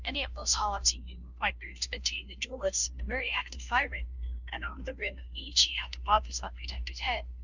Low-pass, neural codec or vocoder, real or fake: 7.2 kHz; codec, 16 kHz, 0.5 kbps, FunCodec, trained on Chinese and English, 25 frames a second; fake